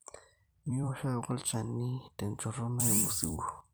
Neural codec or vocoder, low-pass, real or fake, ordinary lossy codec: vocoder, 44.1 kHz, 128 mel bands every 256 samples, BigVGAN v2; none; fake; none